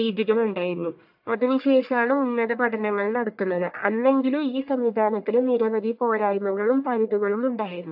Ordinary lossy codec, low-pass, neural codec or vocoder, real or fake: AAC, 48 kbps; 5.4 kHz; codec, 44.1 kHz, 1.7 kbps, Pupu-Codec; fake